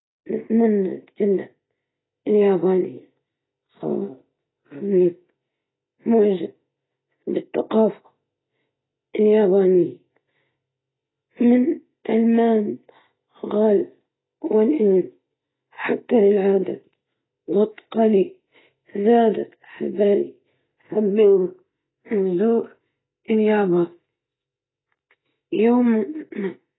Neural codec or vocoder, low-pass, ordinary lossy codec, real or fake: none; 7.2 kHz; AAC, 16 kbps; real